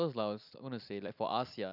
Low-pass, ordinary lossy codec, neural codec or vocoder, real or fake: 5.4 kHz; none; none; real